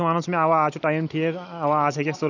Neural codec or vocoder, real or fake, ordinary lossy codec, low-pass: none; real; none; 7.2 kHz